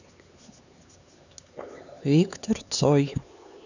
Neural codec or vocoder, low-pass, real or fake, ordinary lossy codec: codec, 16 kHz, 4 kbps, X-Codec, HuBERT features, trained on LibriSpeech; 7.2 kHz; fake; none